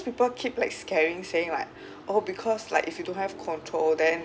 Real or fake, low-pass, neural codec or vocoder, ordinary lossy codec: real; none; none; none